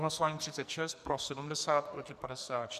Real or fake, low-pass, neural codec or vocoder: fake; 14.4 kHz; codec, 44.1 kHz, 2.6 kbps, SNAC